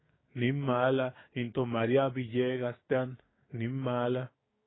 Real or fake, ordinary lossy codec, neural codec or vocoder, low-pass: fake; AAC, 16 kbps; codec, 16 kHz, 6 kbps, DAC; 7.2 kHz